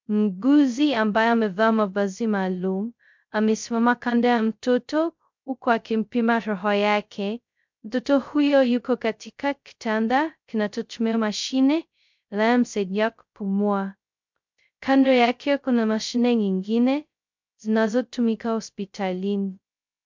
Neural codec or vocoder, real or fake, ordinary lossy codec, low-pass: codec, 16 kHz, 0.2 kbps, FocalCodec; fake; MP3, 64 kbps; 7.2 kHz